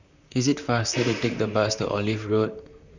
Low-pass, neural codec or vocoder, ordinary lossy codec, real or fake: 7.2 kHz; vocoder, 44.1 kHz, 128 mel bands, Pupu-Vocoder; none; fake